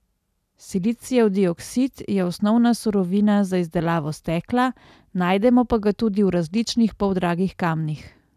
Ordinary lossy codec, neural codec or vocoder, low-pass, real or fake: none; none; 14.4 kHz; real